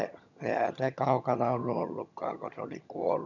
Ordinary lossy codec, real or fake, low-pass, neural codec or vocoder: none; fake; 7.2 kHz; vocoder, 22.05 kHz, 80 mel bands, HiFi-GAN